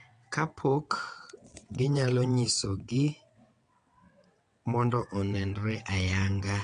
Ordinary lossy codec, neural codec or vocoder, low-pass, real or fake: AAC, 48 kbps; vocoder, 22.05 kHz, 80 mel bands, WaveNeXt; 9.9 kHz; fake